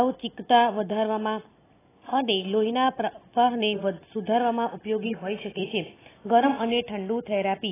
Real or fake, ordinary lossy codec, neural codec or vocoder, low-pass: real; AAC, 16 kbps; none; 3.6 kHz